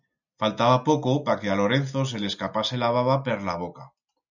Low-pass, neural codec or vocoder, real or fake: 7.2 kHz; none; real